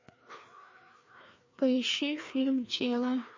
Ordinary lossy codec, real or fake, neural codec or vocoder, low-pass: MP3, 32 kbps; fake; codec, 16 kHz, 2 kbps, FreqCodec, larger model; 7.2 kHz